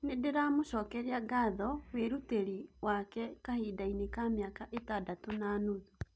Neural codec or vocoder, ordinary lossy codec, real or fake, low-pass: none; none; real; none